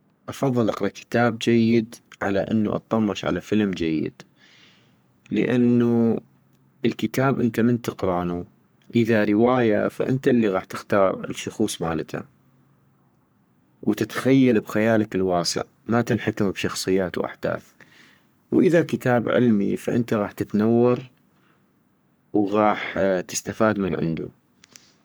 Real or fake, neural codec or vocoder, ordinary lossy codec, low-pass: fake; codec, 44.1 kHz, 3.4 kbps, Pupu-Codec; none; none